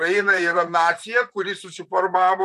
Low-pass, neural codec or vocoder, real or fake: 14.4 kHz; vocoder, 44.1 kHz, 128 mel bands, Pupu-Vocoder; fake